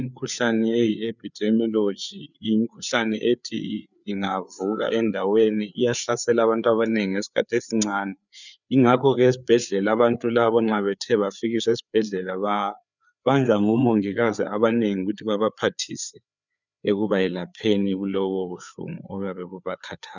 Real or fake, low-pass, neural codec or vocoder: fake; 7.2 kHz; codec, 16 kHz, 4 kbps, FreqCodec, larger model